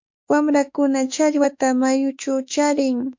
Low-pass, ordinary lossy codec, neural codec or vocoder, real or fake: 7.2 kHz; MP3, 48 kbps; autoencoder, 48 kHz, 32 numbers a frame, DAC-VAE, trained on Japanese speech; fake